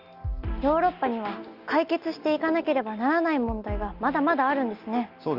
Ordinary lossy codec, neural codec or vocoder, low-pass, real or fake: Opus, 32 kbps; none; 5.4 kHz; real